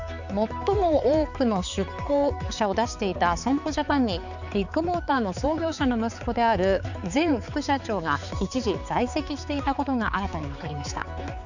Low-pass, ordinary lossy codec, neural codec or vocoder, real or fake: 7.2 kHz; none; codec, 16 kHz, 4 kbps, X-Codec, HuBERT features, trained on balanced general audio; fake